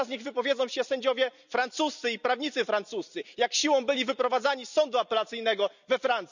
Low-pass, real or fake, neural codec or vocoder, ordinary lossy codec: 7.2 kHz; real; none; none